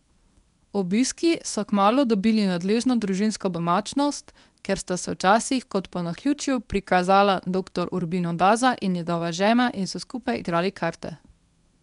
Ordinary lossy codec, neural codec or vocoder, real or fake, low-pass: none; codec, 24 kHz, 0.9 kbps, WavTokenizer, medium speech release version 1; fake; 10.8 kHz